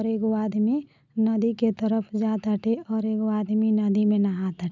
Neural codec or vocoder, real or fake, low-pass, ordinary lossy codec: none; real; 7.2 kHz; none